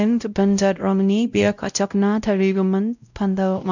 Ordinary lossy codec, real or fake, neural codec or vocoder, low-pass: none; fake; codec, 16 kHz, 0.5 kbps, X-Codec, WavLM features, trained on Multilingual LibriSpeech; 7.2 kHz